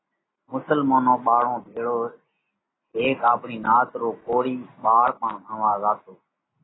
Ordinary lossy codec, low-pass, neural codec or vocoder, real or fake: AAC, 16 kbps; 7.2 kHz; none; real